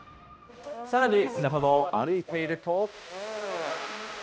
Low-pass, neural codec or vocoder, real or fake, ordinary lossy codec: none; codec, 16 kHz, 1 kbps, X-Codec, HuBERT features, trained on balanced general audio; fake; none